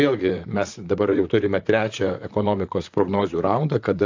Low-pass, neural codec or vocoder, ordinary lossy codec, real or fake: 7.2 kHz; vocoder, 44.1 kHz, 128 mel bands, Pupu-Vocoder; AAC, 48 kbps; fake